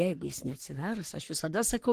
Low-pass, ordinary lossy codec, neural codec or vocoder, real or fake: 14.4 kHz; Opus, 16 kbps; codec, 44.1 kHz, 3.4 kbps, Pupu-Codec; fake